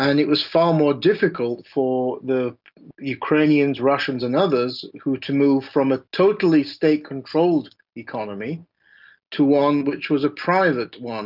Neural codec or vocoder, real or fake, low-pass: none; real; 5.4 kHz